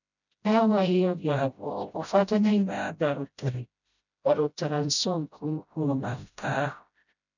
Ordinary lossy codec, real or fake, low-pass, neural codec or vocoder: none; fake; 7.2 kHz; codec, 16 kHz, 0.5 kbps, FreqCodec, smaller model